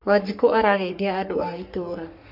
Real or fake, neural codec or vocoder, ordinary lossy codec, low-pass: fake; codec, 44.1 kHz, 3.4 kbps, Pupu-Codec; none; 5.4 kHz